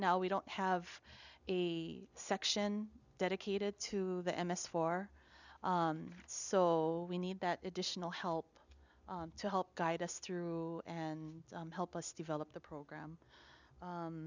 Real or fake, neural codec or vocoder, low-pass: real; none; 7.2 kHz